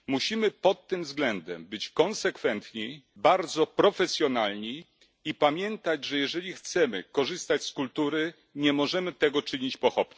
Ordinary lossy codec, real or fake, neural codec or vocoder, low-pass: none; real; none; none